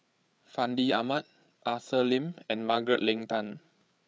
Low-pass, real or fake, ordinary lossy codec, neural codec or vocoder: none; fake; none; codec, 16 kHz, 8 kbps, FreqCodec, larger model